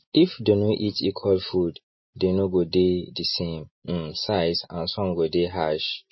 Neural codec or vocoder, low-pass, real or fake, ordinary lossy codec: none; 7.2 kHz; real; MP3, 24 kbps